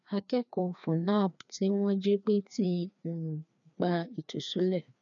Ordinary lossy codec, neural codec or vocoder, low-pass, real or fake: none; codec, 16 kHz, 2 kbps, FreqCodec, larger model; 7.2 kHz; fake